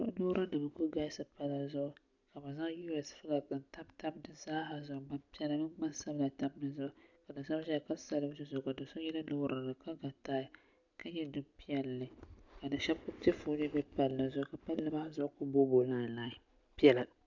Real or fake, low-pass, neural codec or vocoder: real; 7.2 kHz; none